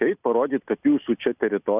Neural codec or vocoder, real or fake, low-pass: none; real; 3.6 kHz